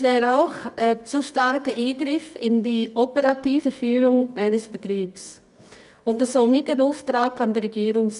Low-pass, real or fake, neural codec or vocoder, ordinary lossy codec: 10.8 kHz; fake; codec, 24 kHz, 0.9 kbps, WavTokenizer, medium music audio release; none